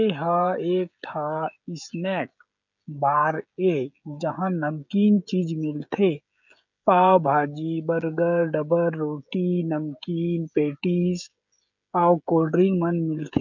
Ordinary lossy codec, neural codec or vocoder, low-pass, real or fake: none; codec, 16 kHz, 16 kbps, FreqCodec, smaller model; 7.2 kHz; fake